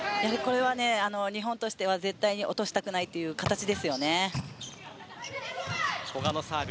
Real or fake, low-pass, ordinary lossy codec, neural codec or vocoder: real; none; none; none